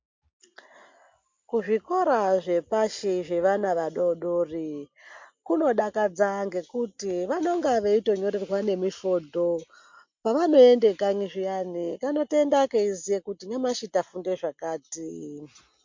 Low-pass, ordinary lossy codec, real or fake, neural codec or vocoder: 7.2 kHz; MP3, 48 kbps; real; none